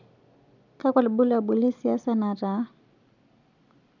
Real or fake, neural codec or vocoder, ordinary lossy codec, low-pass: real; none; none; 7.2 kHz